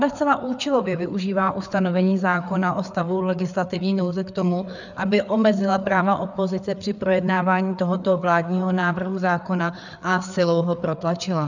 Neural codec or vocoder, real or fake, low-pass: codec, 16 kHz, 4 kbps, FreqCodec, larger model; fake; 7.2 kHz